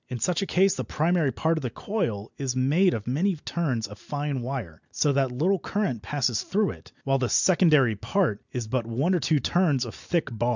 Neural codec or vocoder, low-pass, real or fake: none; 7.2 kHz; real